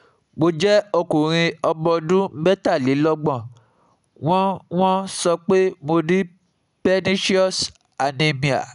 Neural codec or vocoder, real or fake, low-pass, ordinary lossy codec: none; real; 10.8 kHz; none